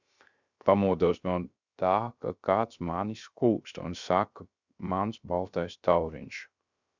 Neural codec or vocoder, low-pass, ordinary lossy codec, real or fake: codec, 16 kHz, 0.3 kbps, FocalCodec; 7.2 kHz; Opus, 64 kbps; fake